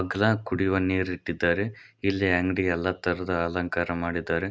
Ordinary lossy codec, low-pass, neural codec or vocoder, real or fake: none; none; none; real